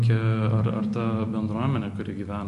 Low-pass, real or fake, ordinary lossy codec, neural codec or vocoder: 14.4 kHz; real; MP3, 48 kbps; none